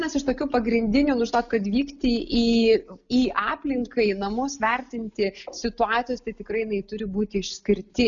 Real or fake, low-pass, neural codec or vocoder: real; 7.2 kHz; none